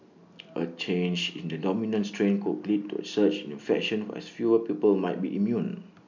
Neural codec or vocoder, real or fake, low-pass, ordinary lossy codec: none; real; 7.2 kHz; none